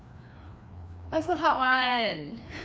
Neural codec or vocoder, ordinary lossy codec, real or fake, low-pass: codec, 16 kHz, 2 kbps, FreqCodec, larger model; none; fake; none